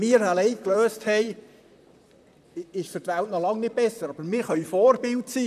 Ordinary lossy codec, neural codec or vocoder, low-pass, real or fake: none; vocoder, 44.1 kHz, 128 mel bands, Pupu-Vocoder; 14.4 kHz; fake